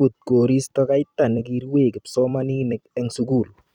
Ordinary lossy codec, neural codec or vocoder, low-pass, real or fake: none; vocoder, 44.1 kHz, 128 mel bands every 256 samples, BigVGAN v2; 19.8 kHz; fake